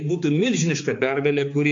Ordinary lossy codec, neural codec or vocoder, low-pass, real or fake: AAC, 48 kbps; codec, 16 kHz, 4 kbps, X-Codec, HuBERT features, trained on balanced general audio; 7.2 kHz; fake